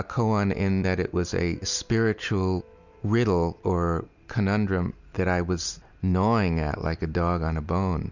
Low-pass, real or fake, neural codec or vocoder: 7.2 kHz; real; none